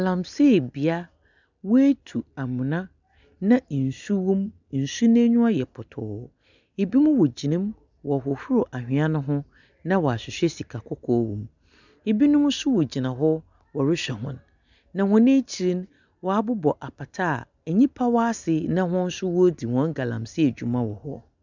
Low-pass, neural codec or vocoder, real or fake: 7.2 kHz; none; real